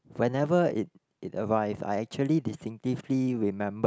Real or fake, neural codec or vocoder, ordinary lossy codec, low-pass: real; none; none; none